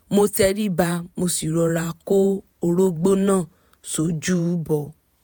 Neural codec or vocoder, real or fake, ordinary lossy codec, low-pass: vocoder, 48 kHz, 128 mel bands, Vocos; fake; none; none